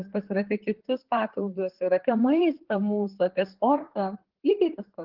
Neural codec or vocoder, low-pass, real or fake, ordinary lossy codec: vocoder, 44.1 kHz, 128 mel bands, Pupu-Vocoder; 5.4 kHz; fake; Opus, 16 kbps